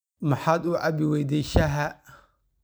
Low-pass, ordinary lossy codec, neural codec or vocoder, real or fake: none; none; none; real